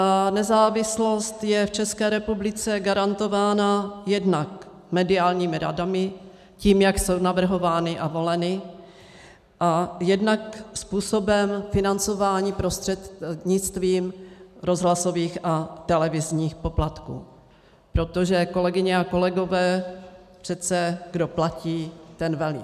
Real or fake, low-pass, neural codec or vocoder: real; 14.4 kHz; none